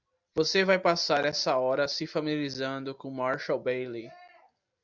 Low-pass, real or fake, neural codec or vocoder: 7.2 kHz; real; none